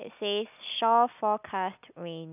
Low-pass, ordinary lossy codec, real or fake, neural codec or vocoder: 3.6 kHz; none; real; none